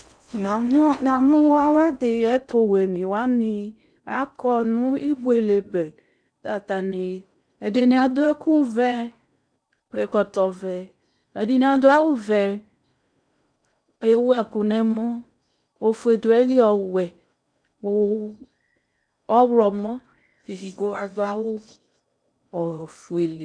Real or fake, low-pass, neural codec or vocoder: fake; 9.9 kHz; codec, 16 kHz in and 24 kHz out, 0.6 kbps, FocalCodec, streaming, 4096 codes